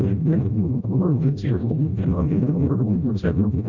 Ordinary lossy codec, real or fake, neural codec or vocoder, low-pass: none; fake; codec, 16 kHz, 0.5 kbps, FreqCodec, smaller model; 7.2 kHz